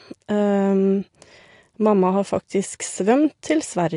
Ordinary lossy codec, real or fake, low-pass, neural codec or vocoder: AAC, 48 kbps; real; 10.8 kHz; none